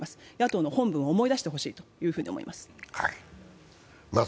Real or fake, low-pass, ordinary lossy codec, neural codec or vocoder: real; none; none; none